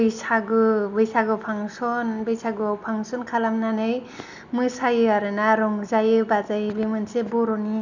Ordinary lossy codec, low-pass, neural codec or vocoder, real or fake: none; 7.2 kHz; none; real